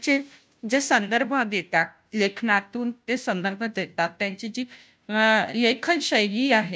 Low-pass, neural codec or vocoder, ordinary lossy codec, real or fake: none; codec, 16 kHz, 0.5 kbps, FunCodec, trained on Chinese and English, 25 frames a second; none; fake